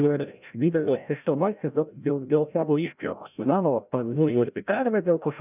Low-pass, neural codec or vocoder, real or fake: 3.6 kHz; codec, 16 kHz, 0.5 kbps, FreqCodec, larger model; fake